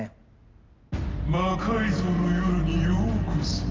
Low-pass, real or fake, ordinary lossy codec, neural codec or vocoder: 7.2 kHz; real; Opus, 32 kbps; none